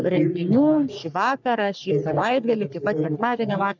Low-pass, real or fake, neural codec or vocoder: 7.2 kHz; fake; codec, 44.1 kHz, 3.4 kbps, Pupu-Codec